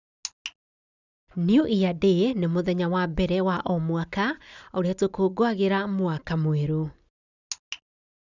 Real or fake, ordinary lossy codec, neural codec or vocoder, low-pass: real; none; none; 7.2 kHz